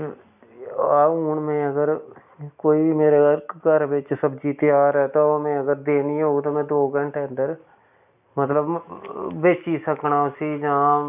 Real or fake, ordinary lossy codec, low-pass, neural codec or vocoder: real; none; 3.6 kHz; none